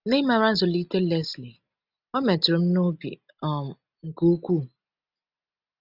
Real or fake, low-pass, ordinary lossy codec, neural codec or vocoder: real; 5.4 kHz; none; none